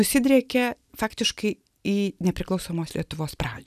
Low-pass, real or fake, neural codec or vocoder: 14.4 kHz; real; none